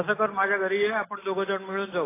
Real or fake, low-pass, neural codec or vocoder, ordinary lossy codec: real; 3.6 kHz; none; AAC, 16 kbps